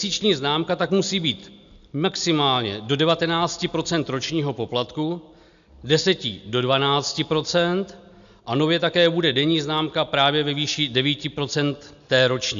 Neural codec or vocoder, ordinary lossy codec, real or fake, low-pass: none; AAC, 96 kbps; real; 7.2 kHz